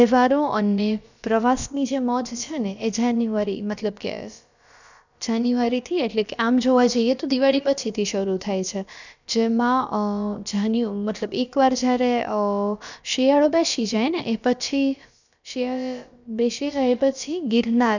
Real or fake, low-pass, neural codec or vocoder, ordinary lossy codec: fake; 7.2 kHz; codec, 16 kHz, about 1 kbps, DyCAST, with the encoder's durations; none